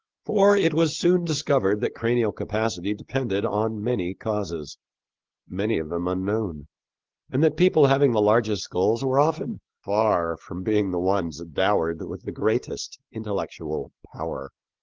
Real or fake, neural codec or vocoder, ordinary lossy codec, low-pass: real; none; Opus, 16 kbps; 7.2 kHz